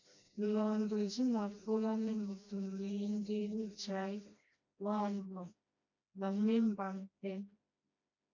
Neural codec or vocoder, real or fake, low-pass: codec, 16 kHz, 1 kbps, FreqCodec, smaller model; fake; 7.2 kHz